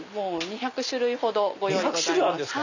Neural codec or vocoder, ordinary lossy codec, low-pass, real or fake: none; none; 7.2 kHz; real